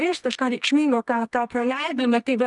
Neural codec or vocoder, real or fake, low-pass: codec, 24 kHz, 0.9 kbps, WavTokenizer, medium music audio release; fake; 10.8 kHz